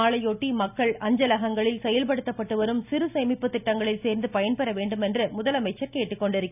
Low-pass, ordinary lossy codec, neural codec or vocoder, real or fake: 3.6 kHz; none; none; real